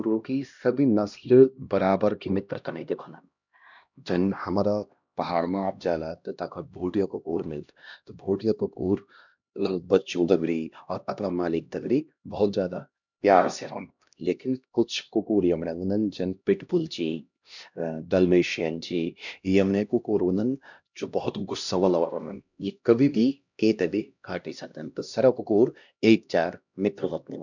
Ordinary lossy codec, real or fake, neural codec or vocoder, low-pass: none; fake; codec, 16 kHz, 1 kbps, X-Codec, HuBERT features, trained on LibriSpeech; 7.2 kHz